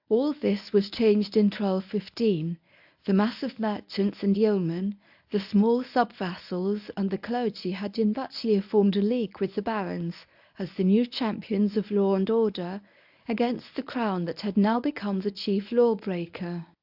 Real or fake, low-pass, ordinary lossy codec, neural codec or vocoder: fake; 5.4 kHz; AAC, 48 kbps; codec, 24 kHz, 0.9 kbps, WavTokenizer, medium speech release version 1